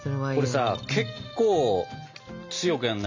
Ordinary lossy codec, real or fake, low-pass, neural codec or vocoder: MP3, 64 kbps; real; 7.2 kHz; none